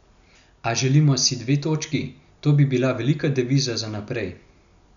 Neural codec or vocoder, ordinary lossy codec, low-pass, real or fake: none; none; 7.2 kHz; real